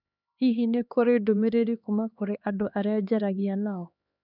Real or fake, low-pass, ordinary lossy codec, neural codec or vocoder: fake; 5.4 kHz; none; codec, 16 kHz, 2 kbps, X-Codec, HuBERT features, trained on LibriSpeech